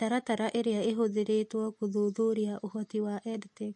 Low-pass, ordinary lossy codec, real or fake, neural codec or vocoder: 10.8 kHz; MP3, 48 kbps; real; none